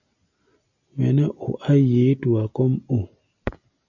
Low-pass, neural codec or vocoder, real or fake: 7.2 kHz; none; real